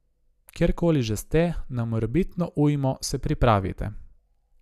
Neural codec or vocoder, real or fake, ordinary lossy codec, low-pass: none; real; none; 14.4 kHz